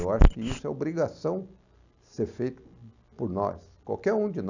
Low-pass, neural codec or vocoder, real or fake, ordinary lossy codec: 7.2 kHz; none; real; none